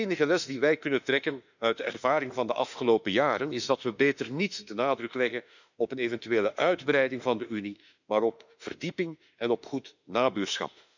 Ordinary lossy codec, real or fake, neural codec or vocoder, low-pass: none; fake; autoencoder, 48 kHz, 32 numbers a frame, DAC-VAE, trained on Japanese speech; 7.2 kHz